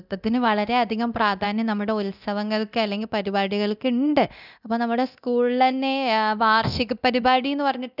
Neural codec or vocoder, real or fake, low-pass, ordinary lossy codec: codec, 24 kHz, 0.9 kbps, DualCodec; fake; 5.4 kHz; none